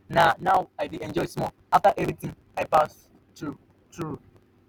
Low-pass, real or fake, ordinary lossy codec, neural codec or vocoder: none; real; none; none